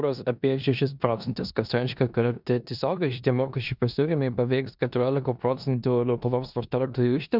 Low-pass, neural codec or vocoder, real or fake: 5.4 kHz; codec, 16 kHz in and 24 kHz out, 0.9 kbps, LongCat-Audio-Codec, four codebook decoder; fake